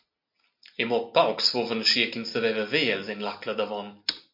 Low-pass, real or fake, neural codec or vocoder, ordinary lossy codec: 5.4 kHz; real; none; MP3, 48 kbps